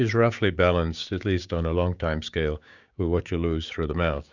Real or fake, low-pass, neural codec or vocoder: fake; 7.2 kHz; codec, 16 kHz, 6 kbps, DAC